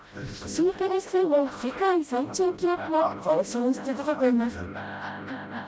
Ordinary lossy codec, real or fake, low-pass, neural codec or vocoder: none; fake; none; codec, 16 kHz, 0.5 kbps, FreqCodec, smaller model